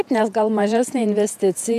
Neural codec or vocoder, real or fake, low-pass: vocoder, 48 kHz, 128 mel bands, Vocos; fake; 14.4 kHz